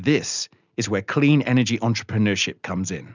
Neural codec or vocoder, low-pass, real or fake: none; 7.2 kHz; real